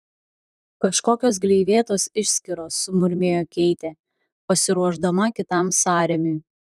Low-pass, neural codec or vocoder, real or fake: 14.4 kHz; vocoder, 44.1 kHz, 128 mel bands, Pupu-Vocoder; fake